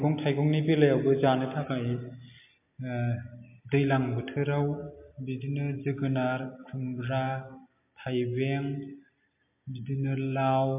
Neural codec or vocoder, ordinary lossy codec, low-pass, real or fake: none; MP3, 32 kbps; 3.6 kHz; real